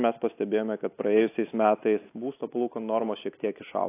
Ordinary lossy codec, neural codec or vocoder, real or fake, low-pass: AAC, 24 kbps; none; real; 3.6 kHz